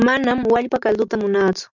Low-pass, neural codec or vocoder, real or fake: 7.2 kHz; none; real